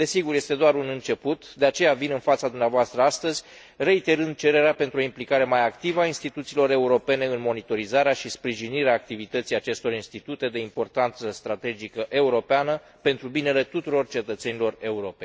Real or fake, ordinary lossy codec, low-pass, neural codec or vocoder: real; none; none; none